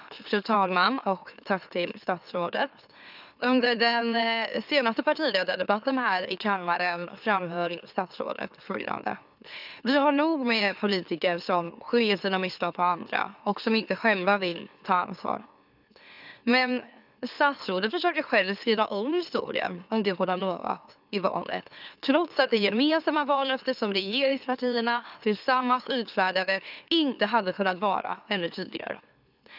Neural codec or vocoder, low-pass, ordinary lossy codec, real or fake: autoencoder, 44.1 kHz, a latent of 192 numbers a frame, MeloTTS; 5.4 kHz; none; fake